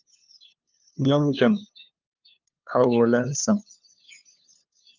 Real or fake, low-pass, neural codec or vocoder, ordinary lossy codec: fake; 7.2 kHz; codec, 16 kHz, 2 kbps, FunCodec, trained on LibriTTS, 25 frames a second; Opus, 24 kbps